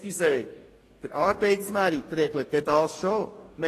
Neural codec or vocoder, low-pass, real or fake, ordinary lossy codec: codec, 44.1 kHz, 2.6 kbps, DAC; 14.4 kHz; fake; AAC, 48 kbps